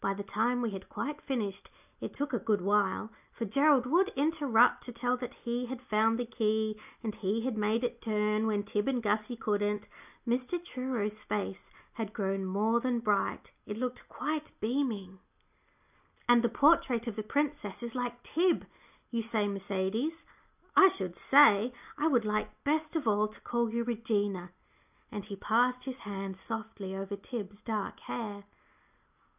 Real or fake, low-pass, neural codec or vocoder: real; 3.6 kHz; none